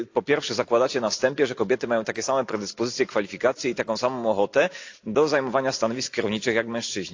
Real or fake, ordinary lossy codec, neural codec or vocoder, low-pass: real; AAC, 48 kbps; none; 7.2 kHz